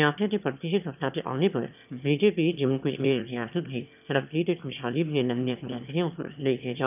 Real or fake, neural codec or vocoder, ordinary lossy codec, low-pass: fake; autoencoder, 22.05 kHz, a latent of 192 numbers a frame, VITS, trained on one speaker; none; 3.6 kHz